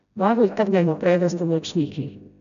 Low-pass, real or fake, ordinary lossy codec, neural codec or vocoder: 7.2 kHz; fake; none; codec, 16 kHz, 0.5 kbps, FreqCodec, smaller model